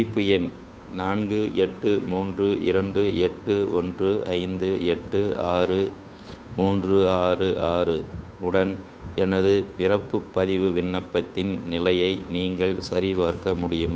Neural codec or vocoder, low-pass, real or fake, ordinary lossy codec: codec, 16 kHz, 2 kbps, FunCodec, trained on Chinese and English, 25 frames a second; none; fake; none